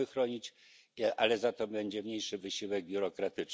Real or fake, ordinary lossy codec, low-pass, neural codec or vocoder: real; none; none; none